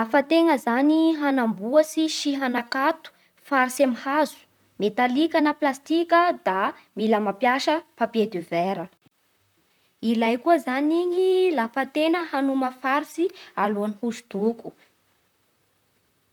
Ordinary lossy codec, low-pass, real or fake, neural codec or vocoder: none; 19.8 kHz; fake; vocoder, 44.1 kHz, 128 mel bands, Pupu-Vocoder